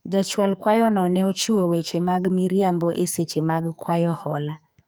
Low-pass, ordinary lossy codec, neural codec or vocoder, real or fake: none; none; codec, 44.1 kHz, 2.6 kbps, SNAC; fake